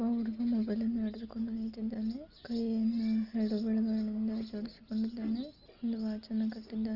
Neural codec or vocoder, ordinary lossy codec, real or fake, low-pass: none; Opus, 24 kbps; real; 5.4 kHz